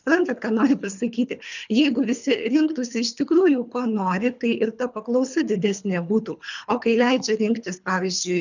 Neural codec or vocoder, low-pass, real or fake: codec, 24 kHz, 3 kbps, HILCodec; 7.2 kHz; fake